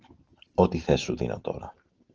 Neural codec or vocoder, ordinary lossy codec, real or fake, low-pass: none; Opus, 16 kbps; real; 7.2 kHz